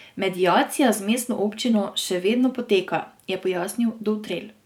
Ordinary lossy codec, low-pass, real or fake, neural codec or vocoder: none; 19.8 kHz; fake; vocoder, 44.1 kHz, 128 mel bands every 512 samples, BigVGAN v2